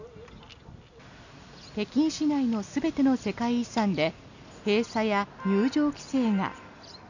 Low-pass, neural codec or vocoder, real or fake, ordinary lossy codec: 7.2 kHz; none; real; none